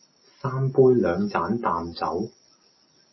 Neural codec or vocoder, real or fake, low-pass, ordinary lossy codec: none; real; 7.2 kHz; MP3, 24 kbps